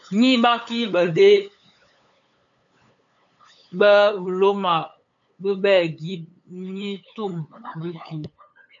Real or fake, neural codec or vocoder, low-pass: fake; codec, 16 kHz, 8 kbps, FunCodec, trained on LibriTTS, 25 frames a second; 7.2 kHz